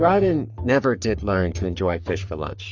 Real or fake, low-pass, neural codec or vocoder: fake; 7.2 kHz; codec, 44.1 kHz, 3.4 kbps, Pupu-Codec